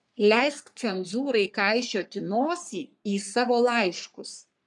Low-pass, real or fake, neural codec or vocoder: 10.8 kHz; fake; codec, 44.1 kHz, 3.4 kbps, Pupu-Codec